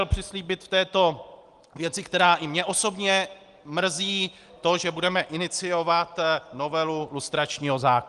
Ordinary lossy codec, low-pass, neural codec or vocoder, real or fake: Opus, 24 kbps; 10.8 kHz; none; real